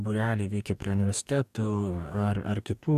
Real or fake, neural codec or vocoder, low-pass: fake; codec, 44.1 kHz, 2.6 kbps, DAC; 14.4 kHz